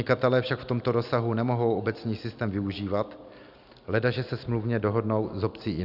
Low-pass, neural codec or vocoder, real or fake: 5.4 kHz; none; real